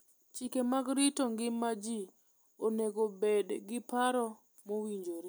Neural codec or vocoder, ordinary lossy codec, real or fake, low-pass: none; none; real; none